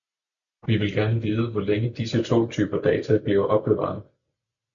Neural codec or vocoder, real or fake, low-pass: none; real; 7.2 kHz